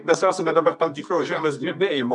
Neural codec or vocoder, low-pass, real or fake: codec, 24 kHz, 0.9 kbps, WavTokenizer, medium music audio release; 10.8 kHz; fake